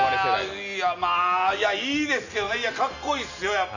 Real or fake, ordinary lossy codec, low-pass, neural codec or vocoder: real; none; 7.2 kHz; none